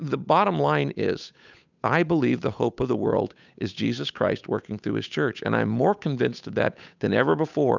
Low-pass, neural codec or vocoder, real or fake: 7.2 kHz; codec, 16 kHz, 8 kbps, FunCodec, trained on Chinese and English, 25 frames a second; fake